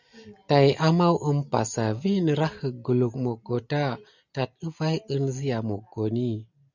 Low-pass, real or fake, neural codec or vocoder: 7.2 kHz; real; none